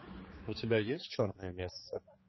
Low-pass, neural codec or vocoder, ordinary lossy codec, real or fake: 7.2 kHz; codec, 16 kHz, 4 kbps, X-Codec, HuBERT features, trained on general audio; MP3, 24 kbps; fake